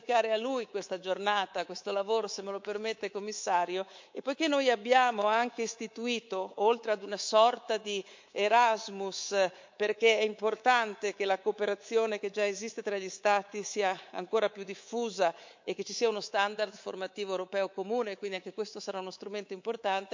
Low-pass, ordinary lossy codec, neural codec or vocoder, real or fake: 7.2 kHz; MP3, 48 kbps; codec, 24 kHz, 3.1 kbps, DualCodec; fake